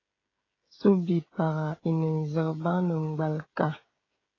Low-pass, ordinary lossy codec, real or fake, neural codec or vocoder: 7.2 kHz; AAC, 32 kbps; fake; codec, 16 kHz, 16 kbps, FreqCodec, smaller model